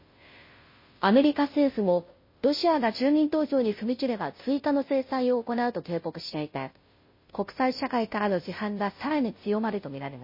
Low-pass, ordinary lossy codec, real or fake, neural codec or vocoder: 5.4 kHz; MP3, 24 kbps; fake; codec, 16 kHz, 0.5 kbps, FunCodec, trained on Chinese and English, 25 frames a second